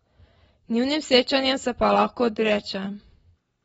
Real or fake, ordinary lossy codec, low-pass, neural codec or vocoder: real; AAC, 24 kbps; 19.8 kHz; none